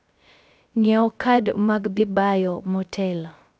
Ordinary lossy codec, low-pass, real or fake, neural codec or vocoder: none; none; fake; codec, 16 kHz, 0.3 kbps, FocalCodec